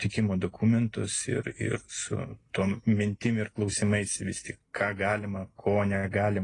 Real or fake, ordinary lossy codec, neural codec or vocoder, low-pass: real; AAC, 32 kbps; none; 10.8 kHz